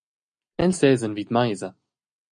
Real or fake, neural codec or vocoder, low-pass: real; none; 9.9 kHz